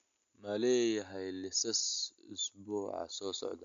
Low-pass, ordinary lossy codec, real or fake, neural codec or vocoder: 7.2 kHz; MP3, 64 kbps; real; none